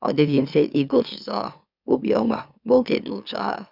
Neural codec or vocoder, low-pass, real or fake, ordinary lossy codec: autoencoder, 44.1 kHz, a latent of 192 numbers a frame, MeloTTS; 5.4 kHz; fake; none